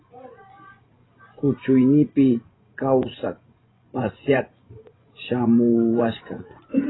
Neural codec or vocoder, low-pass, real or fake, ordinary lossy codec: vocoder, 24 kHz, 100 mel bands, Vocos; 7.2 kHz; fake; AAC, 16 kbps